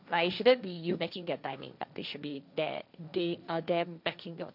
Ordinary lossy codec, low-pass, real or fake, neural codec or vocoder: none; 5.4 kHz; fake; codec, 16 kHz, 1.1 kbps, Voila-Tokenizer